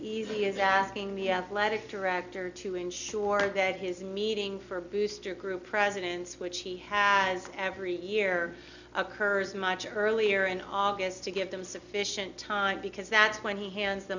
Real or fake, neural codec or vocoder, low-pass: real; none; 7.2 kHz